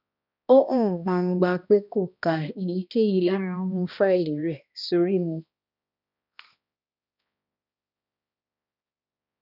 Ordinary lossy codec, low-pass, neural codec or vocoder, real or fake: none; 5.4 kHz; codec, 16 kHz, 1 kbps, X-Codec, HuBERT features, trained on balanced general audio; fake